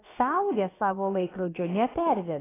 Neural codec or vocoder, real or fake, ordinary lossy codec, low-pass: codec, 16 kHz, about 1 kbps, DyCAST, with the encoder's durations; fake; AAC, 16 kbps; 3.6 kHz